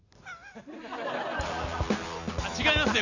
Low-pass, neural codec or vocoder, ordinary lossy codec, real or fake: 7.2 kHz; none; none; real